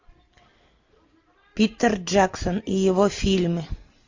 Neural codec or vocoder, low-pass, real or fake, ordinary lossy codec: none; 7.2 kHz; real; MP3, 48 kbps